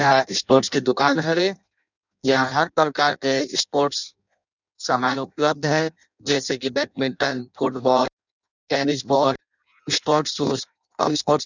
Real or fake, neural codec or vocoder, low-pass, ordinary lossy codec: fake; codec, 16 kHz in and 24 kHz out, 0.6 kbps, FireRedTTS-2 codec; 7.2 kHz; none